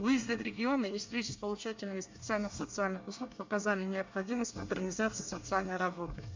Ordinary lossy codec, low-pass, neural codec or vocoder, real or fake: MP3, 64 kbps; 7.2 kHz; codec, 24 kHz, 1 kbps, SNAC; fake